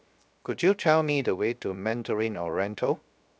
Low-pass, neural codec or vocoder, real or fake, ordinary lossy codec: none; codec, 16 kHz, 0.7 kbps, FocalCodec; fake; none